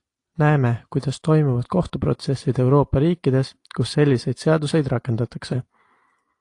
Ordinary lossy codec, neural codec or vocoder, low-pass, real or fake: AAC, 48 kbps; none; 10.8 kHz; real